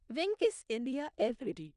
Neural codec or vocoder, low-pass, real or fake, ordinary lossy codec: codec, 16 kHz in and 24 kHz out, 0.4 kbps, LongCat-Audio-Codec, four codebook decoder; 10.8 kHz; fake; none